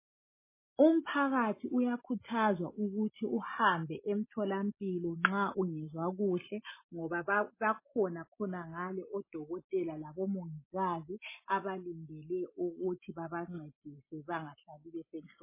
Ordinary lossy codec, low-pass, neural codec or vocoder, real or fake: MP3, 16 kbps; 3.6 kHz; none; real